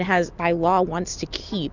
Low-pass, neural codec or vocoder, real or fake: 7.2 kHz; none; real